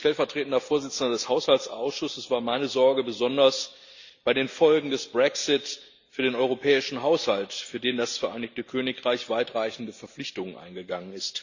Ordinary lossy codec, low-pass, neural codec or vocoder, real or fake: Opus, 64 kbps; 7.2 kHz; none; real